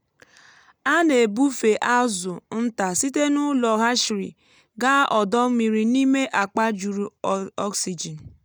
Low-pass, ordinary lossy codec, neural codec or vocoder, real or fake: none; none; none; real